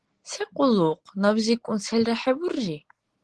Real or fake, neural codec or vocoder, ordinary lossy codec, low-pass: real; none; Opus, 16 kbps; 10.8 kHz